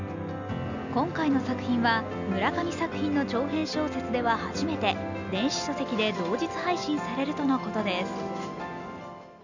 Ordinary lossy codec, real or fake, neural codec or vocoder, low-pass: none; real; none; 7.2 kHz